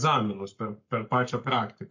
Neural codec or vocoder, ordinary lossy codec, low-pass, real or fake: codec, 44.1 kHz, 7.8 kbps, Pupu-Codec; MP3, 48 kbps; 7.2 kHz; fake